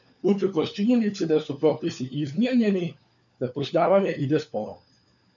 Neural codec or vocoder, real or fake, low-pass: codec, 16 kHz, 4 kbps, FunCodec, trained on LibriTTS, 50 frames a second; fake; 7.2 kHz